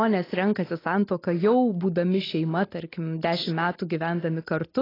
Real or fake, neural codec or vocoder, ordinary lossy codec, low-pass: real; none; AAC, 24 kbps; 5.4 kHz